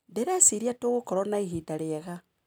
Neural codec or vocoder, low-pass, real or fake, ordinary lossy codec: none; none; real; none